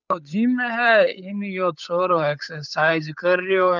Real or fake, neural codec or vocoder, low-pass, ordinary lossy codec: fake; codec, 16 kHz, 8 kbps, FunCodec, trained on Chinese and English, 25 frames a second; 7.2 kHz; none